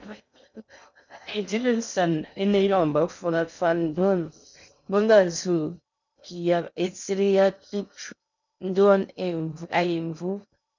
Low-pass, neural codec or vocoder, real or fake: 7.2 kHz; codec, 16 kHz in and 24 kHz out, 0.6 kbps, FocalCodec, streaming, 2048 codes; fake